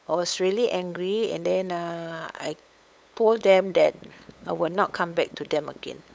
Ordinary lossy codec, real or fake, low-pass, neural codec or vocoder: none; fake; none; codec, 16 kHz, 8 kbps, FunCodec, trained on LibriTTS, 25 frames a second